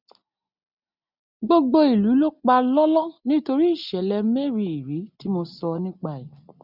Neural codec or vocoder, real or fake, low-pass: none; real; 5.4 kHz